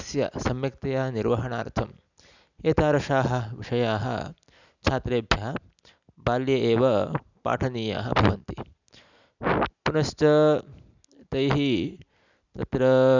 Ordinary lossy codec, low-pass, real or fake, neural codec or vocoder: none; 7.2 kHz; real; none